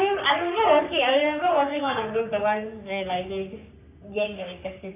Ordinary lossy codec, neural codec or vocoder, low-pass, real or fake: none; codec, 44.1 kHz, 3.4 kbps, Pupu-Codec; 3.6 kHz; fake